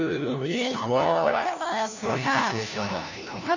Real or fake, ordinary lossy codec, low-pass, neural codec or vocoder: fake; none; 7.2 kHz; codec, 16 kHz, 1 kbps, FunCodec, trained on LibriTTS, 50 frames a second